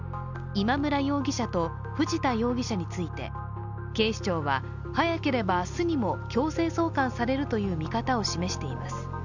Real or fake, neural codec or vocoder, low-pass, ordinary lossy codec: real; none; 7.2 kHz; none